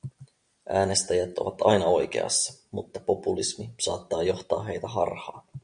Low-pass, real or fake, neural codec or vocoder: 9.9 kHz; real; none